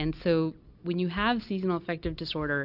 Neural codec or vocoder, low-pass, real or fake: none; 5.4 kHz; real